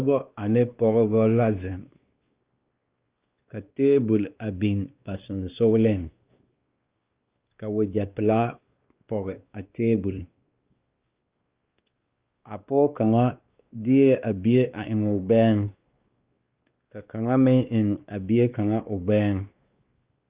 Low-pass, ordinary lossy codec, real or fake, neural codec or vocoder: 3.6 kHz; Opus, 24 kbps; fake; codec, 16 kHz, 2 kbps, X-Codec, WavLM features, trained on Multilingual LibriSpeech